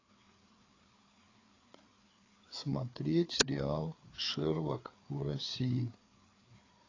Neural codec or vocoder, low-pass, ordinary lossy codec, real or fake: codec, 16 kHz, 4 kbps, FreqCodec, larger model; 7.2 kHz; AAC, 32 kbps; fake